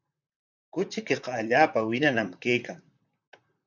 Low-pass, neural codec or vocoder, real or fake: 7.2 kHz; vocoder, 44.1 kHz, 128 mel bands, Pupu-Vocoder; fake